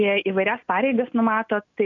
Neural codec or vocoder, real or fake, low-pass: none; real; 7.2 kHz